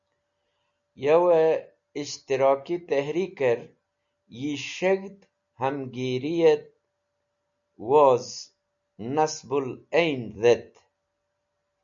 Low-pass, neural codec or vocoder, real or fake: 7.2 kHz; none; real